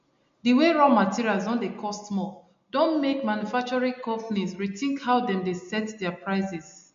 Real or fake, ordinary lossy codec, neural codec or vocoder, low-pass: real; MP3, 48 kbps; none; 7.2 kHz